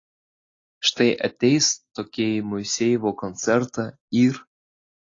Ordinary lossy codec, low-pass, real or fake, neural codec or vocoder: AAC, 32 kbps; 7.2 kHz; real; none